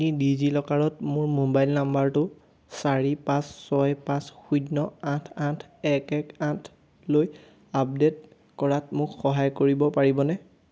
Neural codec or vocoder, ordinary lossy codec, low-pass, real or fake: none; none; none; real